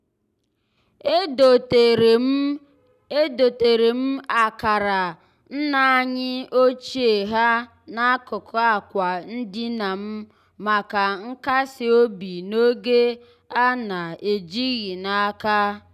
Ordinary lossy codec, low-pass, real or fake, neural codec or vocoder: none; 14.4 kHz; real; none